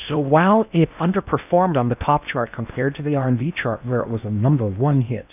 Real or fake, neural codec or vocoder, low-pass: fake; codec, 16 kHz in and 24 kHz out, 0.6 kbps, FocalCodec, streaming, 4096 codes; 3.6 kHz